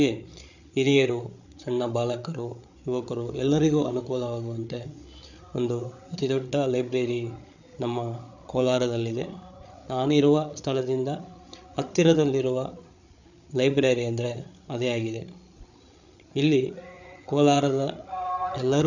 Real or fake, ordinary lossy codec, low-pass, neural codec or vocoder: fake; none; 7.2 kHz; codec, 16 kHz, 8 kbps, FreqCodec, larger model